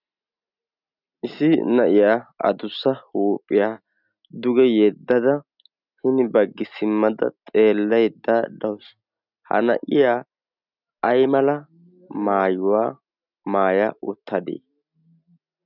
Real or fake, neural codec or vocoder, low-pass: real; none; 5.4 kHz